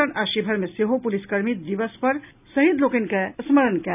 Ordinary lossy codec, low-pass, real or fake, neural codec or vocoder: none; 3.6 kHz; real; none